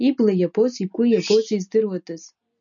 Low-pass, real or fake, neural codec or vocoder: 7.2 kHz; real; none